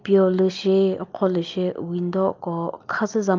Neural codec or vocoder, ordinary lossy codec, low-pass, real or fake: none; Opus, 32 kbps; 7.2 kHz; real